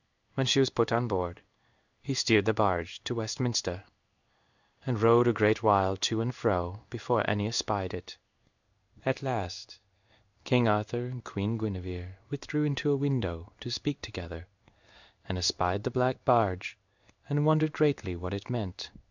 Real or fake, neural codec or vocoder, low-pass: fake; codec, 16 kHz in and 24 kHz out, 1 kbps, XY-Tokenizer; 7.2 kHz